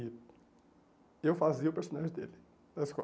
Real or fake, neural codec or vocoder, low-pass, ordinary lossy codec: real; none; none; none